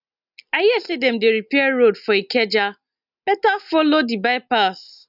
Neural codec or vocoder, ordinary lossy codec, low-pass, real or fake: none; none; 5.4 kHz; real